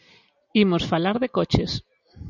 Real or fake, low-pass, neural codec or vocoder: real; 7.2 kHz; none